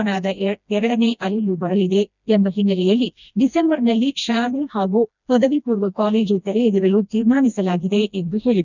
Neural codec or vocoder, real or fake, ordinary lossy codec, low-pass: codec, 16 kHz, 1 kbps, FreqCodec, smaller model; fake; none; 7.2 kHz